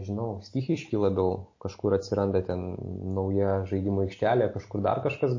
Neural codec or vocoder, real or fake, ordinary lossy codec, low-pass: none; real; MP3, 32 kbps; 7.2 kHz